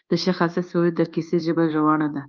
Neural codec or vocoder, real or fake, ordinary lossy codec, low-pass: codec, 24 kHz, 1.2 kbps, DualCodec; fake; Opus, 24 kbps; 7.2 kHz